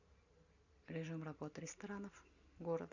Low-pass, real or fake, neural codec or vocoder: 7.2 kHz; real; none